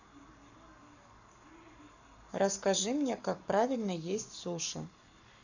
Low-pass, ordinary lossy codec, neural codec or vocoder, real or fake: 7.2 kHz; none; codec, 44.1 kHz, 7.8 kbps, Pupu-Codec; fake